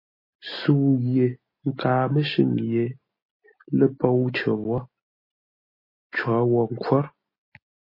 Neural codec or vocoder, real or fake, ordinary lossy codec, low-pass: none; real; MP3, 24 kbps; 5.4 kHz